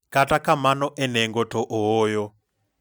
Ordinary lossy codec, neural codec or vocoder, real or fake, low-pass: none; none; real; none